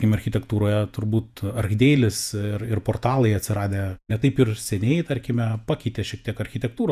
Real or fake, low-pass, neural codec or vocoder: fake; 14.4 kHz; vocoder, 48 kHz, 128 mel bands, Vocos